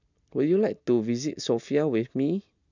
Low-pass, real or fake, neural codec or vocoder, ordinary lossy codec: 7.2 kHz; real; none; none